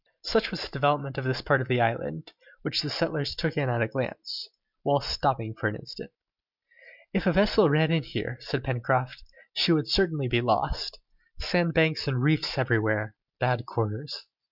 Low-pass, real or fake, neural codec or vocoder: 5.4 kHz; real; none